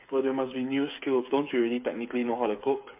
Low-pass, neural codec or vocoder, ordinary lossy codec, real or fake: 3.6 kHz; codec, 16 kHz, 8 kbps, FreqCodec, smaller model; MP3, 32 kbps; fake